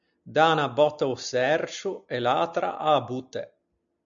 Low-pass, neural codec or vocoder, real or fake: 7.2 kHz; none; real